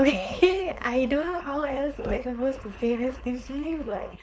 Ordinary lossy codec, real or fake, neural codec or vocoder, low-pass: none; fake; codec, 16 kHz, 4.8 kbps, FACodec; none